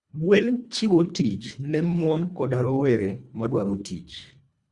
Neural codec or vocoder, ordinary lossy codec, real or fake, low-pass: codec, 24 kHz, 1.5 kbps, HILCodec; Opus, 64 kbps; fake; 10.8 kHz